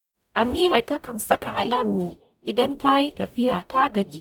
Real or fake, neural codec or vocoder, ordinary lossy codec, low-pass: fake; codec, 44.1 kHz, 0.9 kbps, DAC; none; 19.8 kHz